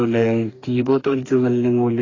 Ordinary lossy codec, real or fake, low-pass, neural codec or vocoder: AAC, 32 kbps; fake; 7.2 kHz; codec, 44.1 kHz, 2.6 kbps, DAC